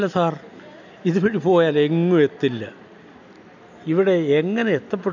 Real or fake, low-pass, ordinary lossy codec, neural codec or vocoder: real; 7.2 kHz; none; none